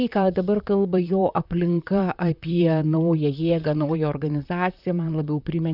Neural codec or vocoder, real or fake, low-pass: vocoder, 44.1 kHz, 128 mel bands, Pupu-Vocoder; fake; 5.4 kHz